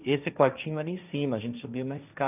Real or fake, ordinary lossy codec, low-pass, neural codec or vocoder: fake; none; 3.6 kHz; codec, 16 kHz, 1.1 kbps, Voila-Tokenizer